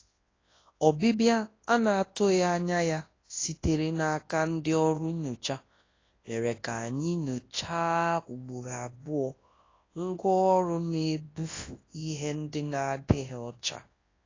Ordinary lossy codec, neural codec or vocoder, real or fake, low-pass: AAC, 32 kbps; codec, 24 kHz, 0.9 kbps, WavTokenizer, large speech release; fake; 7.2 kHz